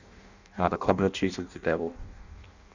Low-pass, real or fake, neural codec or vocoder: 7.2 kHz; fake; codec, 16 kHz in and 24 kHz out, 0.6 kbps, FireRedTTS-2 codec